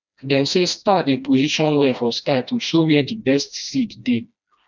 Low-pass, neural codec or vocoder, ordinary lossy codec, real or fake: 7.2 kHz; codec, 16 kHz, 1 kbps, FreqCodec, smaller model; none; fake